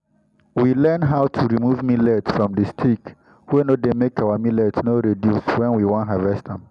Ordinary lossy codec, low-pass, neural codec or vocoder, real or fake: none; 10.8 kHz; none; real